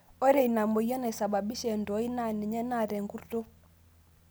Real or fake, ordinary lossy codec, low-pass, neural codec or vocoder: real; none; none; none